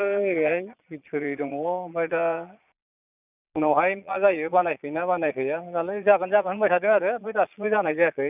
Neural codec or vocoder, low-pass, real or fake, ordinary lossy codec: vocoder, 22.05 kHz, 80 mel bands, Vocos; 3.6 kHz; fake; none